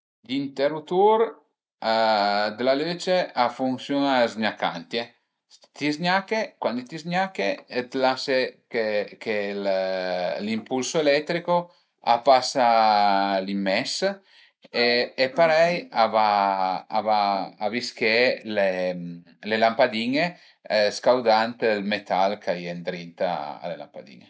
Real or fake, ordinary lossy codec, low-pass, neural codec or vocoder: real; none; none; none